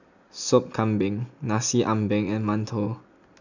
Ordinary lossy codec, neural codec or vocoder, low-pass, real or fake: none; none; 7.2 kHz; real